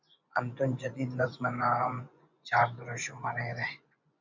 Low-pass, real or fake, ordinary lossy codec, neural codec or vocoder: 7.2 kHz; fake; MP3, 64 kbps; vocoder, 24 kHz, 100 mel bands, Vocos